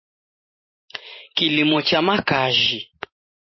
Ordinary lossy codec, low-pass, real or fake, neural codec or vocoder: MP3, 24 kbps; 7.2 kHz; fake; vocoder, 44.1 kHz, 128 mel bands every 512 samples, BigVGAN v2